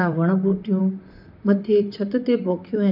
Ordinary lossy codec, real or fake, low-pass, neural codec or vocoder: none; fake; 5.4 kHz; vocoder, 44.1 kHz, 128 mel bands, Pupu-Vocoder